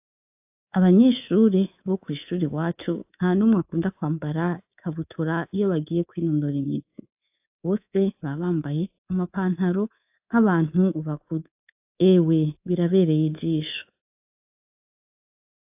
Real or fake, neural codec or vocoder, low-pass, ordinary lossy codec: fake; codec, 24 kHz, 3.1 kbps, DualCodec; 3.6 kHz; AAC, 32 kbps